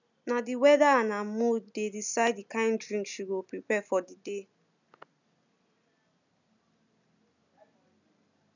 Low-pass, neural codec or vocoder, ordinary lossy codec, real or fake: 7.2 kHz; none; none; real